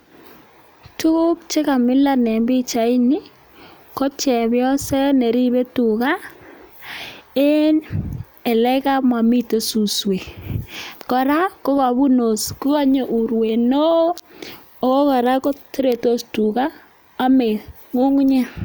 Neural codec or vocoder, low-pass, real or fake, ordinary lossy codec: none; none; real; none